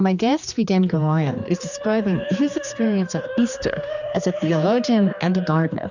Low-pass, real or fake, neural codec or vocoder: 7.2 kHz; fake; codec, 16 kHz, 2 kbps, X-Codec, HuBERT features, trained on general audio